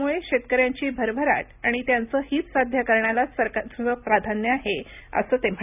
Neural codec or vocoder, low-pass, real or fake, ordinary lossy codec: none; 3.6 kHz; real; none